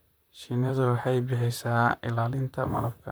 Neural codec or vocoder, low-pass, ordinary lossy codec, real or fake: vocoder, 44.1 kHz, 128 mel bands, Pupu-Vocoder; none; none; fake